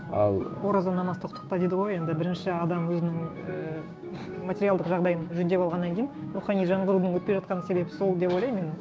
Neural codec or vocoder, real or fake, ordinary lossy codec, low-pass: codec, 16 kHz, 6 kbps, DAC; fake; none; none